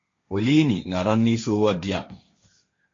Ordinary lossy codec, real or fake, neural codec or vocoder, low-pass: MP3, 48 kbps; fake; codec, 16 kHz, 1.1 kbps, Voila-Tokenizer; 7.2 kHz